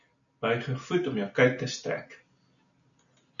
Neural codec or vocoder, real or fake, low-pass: none; real; 7.2 kHz